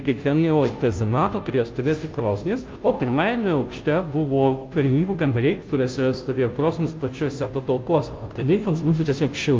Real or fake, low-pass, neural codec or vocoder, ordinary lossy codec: fake; 7.2 kHz; codec, 16 kHz, 0.5 kbps, FunCodec, trained on Chinese and English, 25 frames a second; Opus, 24 kbps